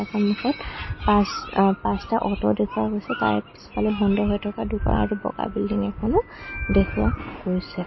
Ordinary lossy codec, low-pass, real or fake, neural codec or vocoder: MP3, 24 kbps; 7.2 kHz; real; none